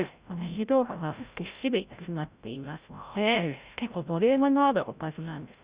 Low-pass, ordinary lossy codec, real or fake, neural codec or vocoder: 3.6 kHz; Opus, 64 kbps; fake; codec, 16 kHz, 0.5 kbps, FreqCodec, larger model